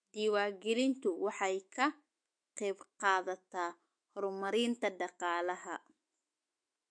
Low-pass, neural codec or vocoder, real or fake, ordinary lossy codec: 9.9 kHz; autoencoder, 48 kHz, 128 numbers a frame, DAC-VAE, trained on Japanese speech; fake; MP3, 48 kbps